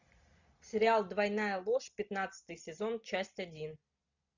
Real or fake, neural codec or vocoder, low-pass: real; none; 7.2 kHz